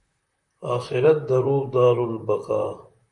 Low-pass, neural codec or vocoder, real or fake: 10.8 kHz; vocoder, 44.1 kHz, 128 mel bands, Pupu-Vocoder; fake